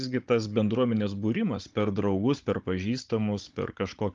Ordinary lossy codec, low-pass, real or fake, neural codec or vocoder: Opus, 32 kbps; 7.2 kHz; fake; codec, 16 kHz, 16 kbps, FunCodec, trained on Chinese and English, 50 frames a second